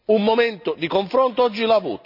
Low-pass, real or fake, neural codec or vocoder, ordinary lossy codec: 5.4 kHz; real; none; none